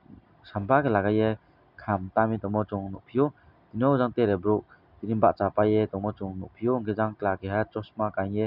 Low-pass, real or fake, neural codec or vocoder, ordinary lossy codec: 5.4 kHz; real; none; none